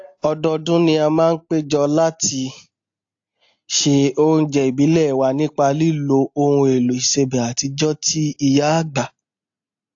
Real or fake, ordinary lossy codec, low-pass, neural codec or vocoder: real; AAC, 48 kbps; 7.2 kHz; none